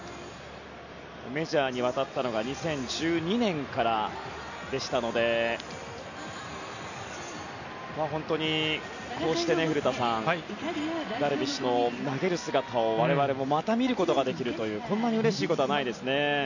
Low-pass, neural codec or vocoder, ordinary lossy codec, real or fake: 7.2 kHz; none; none; real